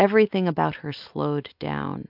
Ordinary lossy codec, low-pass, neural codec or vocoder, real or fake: MP3, 48 kbps; 5.4 kHz; none; real